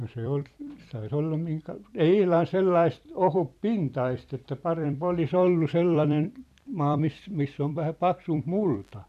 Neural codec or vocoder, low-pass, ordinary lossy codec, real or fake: vocoder, 44.1 kHz, 128 mel bands every 256 samples, BigVGAN v2; 14.4 kHz; none; fake